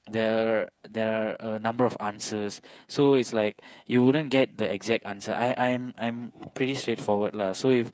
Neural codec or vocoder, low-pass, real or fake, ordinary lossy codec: codec, 16 kHz, 8 kbps, FreqCodec, smaller model; none; fake; none